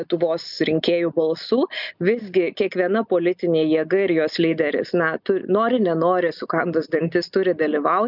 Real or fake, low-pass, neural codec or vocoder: real; 5.4 kHz; none